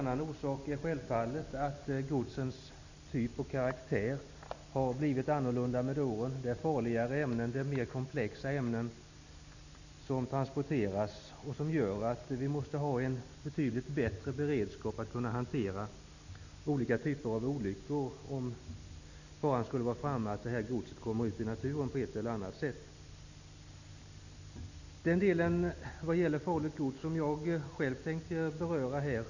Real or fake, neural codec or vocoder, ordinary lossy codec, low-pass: real; none; none; 7.2 kHz